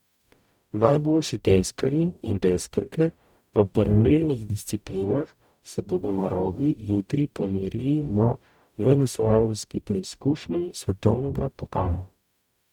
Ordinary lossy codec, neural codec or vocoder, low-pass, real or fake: none; codec, 44.1 kHz, 0.9 kbps, DAC; 19.8 kHz; fake